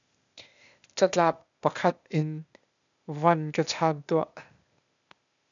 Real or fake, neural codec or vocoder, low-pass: fake; codec, 16 kHz, 0.8 kbps, ZipCodec; 7.2 kHz